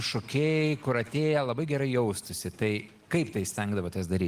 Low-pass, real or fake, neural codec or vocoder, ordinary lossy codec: 14.4 kHz; real; none; Opus, 24 kbps